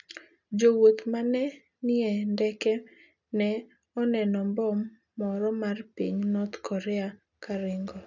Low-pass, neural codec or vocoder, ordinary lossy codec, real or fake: 7.2 kHz; none; none; real